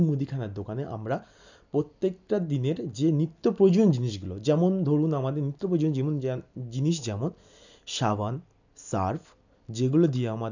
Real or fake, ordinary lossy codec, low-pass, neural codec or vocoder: real; none; 7.2 kHz; none